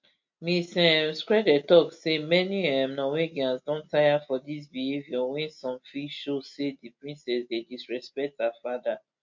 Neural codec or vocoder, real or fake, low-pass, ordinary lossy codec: none; real; 7.2 kHz; MP3, 48 kbps